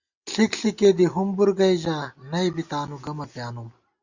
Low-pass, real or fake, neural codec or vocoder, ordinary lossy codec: 7.2 kHz; real; none; Opus, 64 kbps